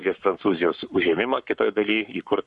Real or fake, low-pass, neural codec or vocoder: fake; 10.8 kHz; codec, 44.1 kHz, 7.8 kbps, Pupu-Codec